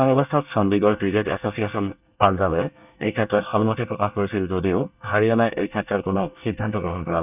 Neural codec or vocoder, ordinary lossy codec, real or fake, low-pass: codec, 24 kHz, 1 kbps, SNAC; none; fake; 3.6 kHz